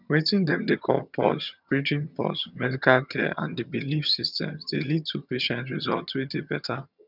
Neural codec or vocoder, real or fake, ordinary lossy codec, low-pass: vocoder, 22.05 kHz, 80 mel bands, HiFi-GAN; fake; none; 5.4 kHz